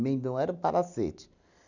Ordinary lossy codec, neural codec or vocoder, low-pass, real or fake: none; none; 7.2 kHz; real